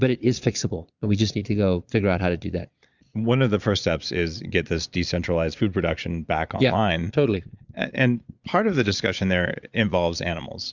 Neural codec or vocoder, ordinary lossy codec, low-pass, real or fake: vocoder, 22.05 kHz, 80 mel bands, Vocos; Opus, 64 kbps; 7.2 kHz; fake